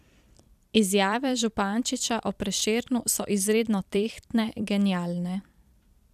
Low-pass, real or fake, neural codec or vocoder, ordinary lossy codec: 14.4 kHz; fake; vocoder, 44.1 kHz, 128 mel bands every 256 samples, BigVGAN v2; none